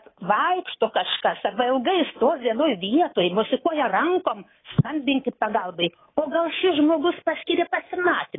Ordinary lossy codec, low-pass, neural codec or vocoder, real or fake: AAC, 16 kbps; 7.2 kHz; codec, 24 kHz, 3.1 kbps, DualCodec; fake